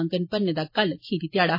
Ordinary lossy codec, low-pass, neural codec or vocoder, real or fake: MP3, 24 kbps; 5.4 kHz; none; real